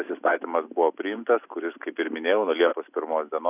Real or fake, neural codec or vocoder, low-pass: real; none; 3.6 kHz